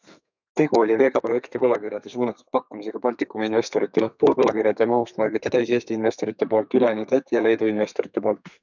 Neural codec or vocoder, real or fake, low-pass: codec, 32 kHz, 1.9 kbps, SNAC; fake; 7.2 kHz